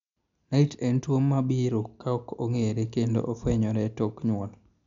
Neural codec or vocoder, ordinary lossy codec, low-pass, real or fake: none; MP3, 64 kbps; 7.2 kHz; real